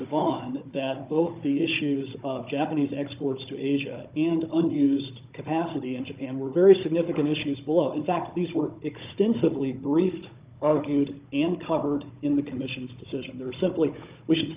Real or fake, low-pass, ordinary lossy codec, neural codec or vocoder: fake; 3.6 kHz; Opus, 24 kbps; codec, 16 kHz, 16 kbps, FunCodec, trained on Chinese and English, 50 frames a second